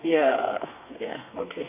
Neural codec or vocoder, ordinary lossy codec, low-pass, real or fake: codec, 32 kHz, 1.9 kbps, SNAC; none; 3.6 kHz; fake